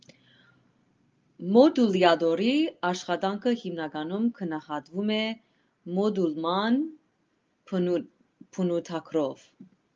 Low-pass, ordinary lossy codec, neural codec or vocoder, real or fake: 7.2 kHz; Opus, 24 kbps; none; real